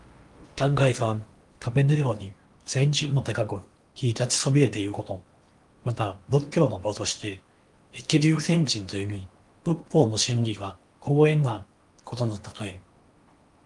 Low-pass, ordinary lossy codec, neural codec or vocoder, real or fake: 10.8 kHz; Opus, 32 kbps; codec, 16 kHz in and 24 kHz out, 0.8 kbps, FocalCodec, streaming, 65536 codes; fake